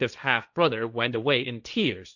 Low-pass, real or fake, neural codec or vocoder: 7.2 kHz; fake; codec, 16 kHz, 1.1 kbps, Voila-Tokenizer